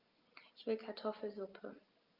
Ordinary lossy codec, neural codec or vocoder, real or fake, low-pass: Opus, 24 kbps; none; real; 5.4 kHz